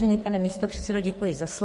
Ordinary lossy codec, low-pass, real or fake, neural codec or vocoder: MP3, 48 kbps; 14.4 kHz; fake; codec, 44.1 kHz, 2.6 kbps, SNAC